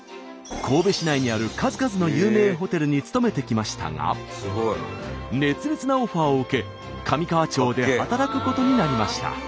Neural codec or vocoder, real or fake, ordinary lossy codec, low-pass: none; real; none; none